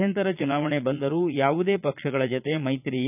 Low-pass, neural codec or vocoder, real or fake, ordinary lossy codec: 3.6 kHz; vocoder, 22.05 kHz, 80 mel bands, Vocos; fake; none